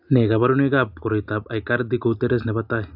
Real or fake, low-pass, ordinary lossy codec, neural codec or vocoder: real; 5.4 kHz; AAC, 48 kbps; none